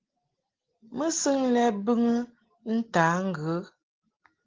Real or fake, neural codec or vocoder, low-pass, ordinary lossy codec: real; none; 7.2 kHz; Opus, 16 kbps